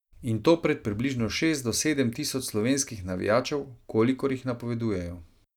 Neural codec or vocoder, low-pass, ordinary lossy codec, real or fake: none; 19.8 kHz; none; real